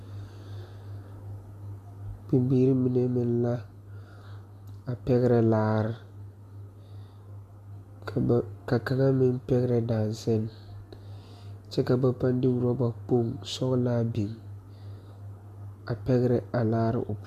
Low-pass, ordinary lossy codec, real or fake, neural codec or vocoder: 14.4 kHz; MP3, 96 kbps; real; none